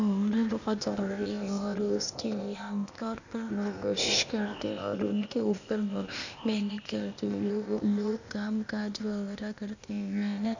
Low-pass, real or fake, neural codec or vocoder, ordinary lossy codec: 7.2 kHz; fake; codec, 16 kHz, 0.8 kbps, ZipCodec; none